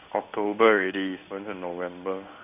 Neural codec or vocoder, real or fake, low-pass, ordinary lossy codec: codec, 16 kHz in and 24 kHz out, 1 kbps, XY-Tokenizer; fake; 3.6 kHz; none